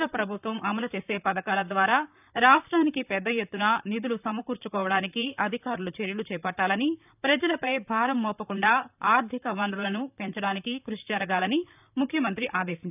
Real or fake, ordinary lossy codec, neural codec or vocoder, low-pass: fake; none; vocoder, 44.1 kHz, 128 mel bands, Pupu-Vocoder; 3.6 kHz